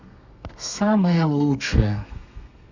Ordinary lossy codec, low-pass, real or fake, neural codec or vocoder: Opus, 64 kbps; 7.2 kHz; fake; codec, 32 kHz, 1.9 kbps, SNAC